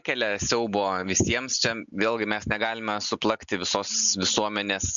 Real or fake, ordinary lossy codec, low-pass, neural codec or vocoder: real; MP3, 64 kbps; 7.2 kHz; none